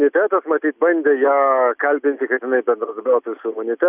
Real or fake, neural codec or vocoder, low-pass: real; none; 3.6 kHz